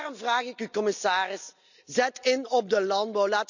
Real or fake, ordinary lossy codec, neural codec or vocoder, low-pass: real; none; none; 7.2 kHz